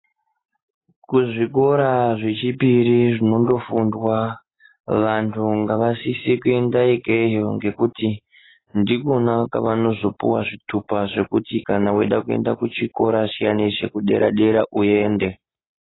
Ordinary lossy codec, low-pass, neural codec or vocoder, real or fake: AAC, 16 kbps; 7.2 kHz; none; real